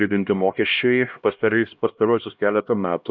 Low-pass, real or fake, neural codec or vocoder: 7.2 kHz; fake; codec, 16 kHz, 1 kbps, X-Codec, HuBERT features, trained on LibriSpeech